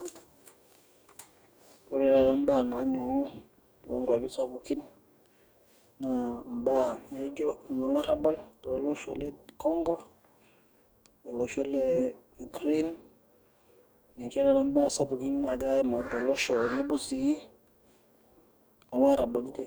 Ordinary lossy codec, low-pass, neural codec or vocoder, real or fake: none; none; codec, 44.1 kHz, 2.6 kbps, DAC; fake